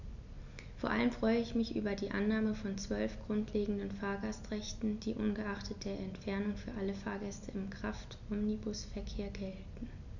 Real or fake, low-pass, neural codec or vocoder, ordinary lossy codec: real; 7.2 kHz; none; none